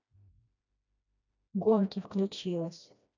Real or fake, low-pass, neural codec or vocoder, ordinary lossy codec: fake; 7.2 kHz; codec, 16 kHz, 1 kbps, FreqCodec, smaller model; none